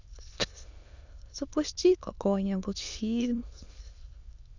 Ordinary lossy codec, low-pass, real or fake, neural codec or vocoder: none; 7.2 kHz; fake; autoencoder, 22.05 kHz, a latent of 192 numbers a frame, VITS, trained on many speakers